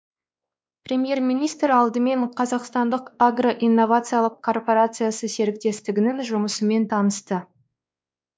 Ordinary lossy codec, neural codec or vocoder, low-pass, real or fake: none; codec, 16 kHz, 2 kbps, X-Codec, WavLM features, trained on Multilingual LibriSpeech; none; fake